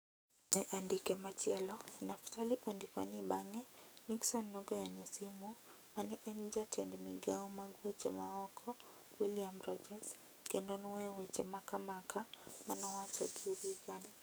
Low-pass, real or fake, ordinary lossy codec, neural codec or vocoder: none; fake; none; codec, 44.1 kHz, 7.8 kbps, DAC